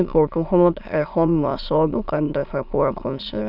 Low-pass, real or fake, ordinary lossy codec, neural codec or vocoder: 5.4 kHz; fake; none; autoencoder, 22.05 kHz, a latent of 192 numbers a frame, VITS, trained on many speakers